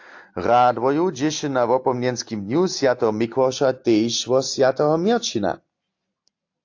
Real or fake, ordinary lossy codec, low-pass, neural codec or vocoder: real; AAC, 48 kbps; 7.2 kHz; none